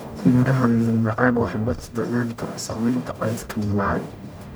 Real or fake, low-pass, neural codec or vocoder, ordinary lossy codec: fake; none; codec, 44.1 kHz, 0.9 kbps, DAC; none